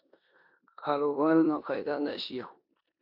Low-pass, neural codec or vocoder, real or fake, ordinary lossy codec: 5.4 kHz; codec, 16 kHz in and 24 kHz out, 0.9 kbps, LongCat-Audio-Codec, four codebook decoder; fake; AAC, 48 kbps